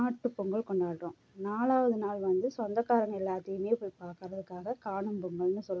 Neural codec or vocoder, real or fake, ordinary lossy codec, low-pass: none; real; none; none